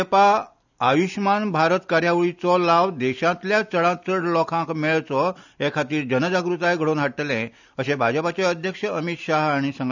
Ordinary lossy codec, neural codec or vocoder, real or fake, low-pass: none; none; real; 7.2 kHz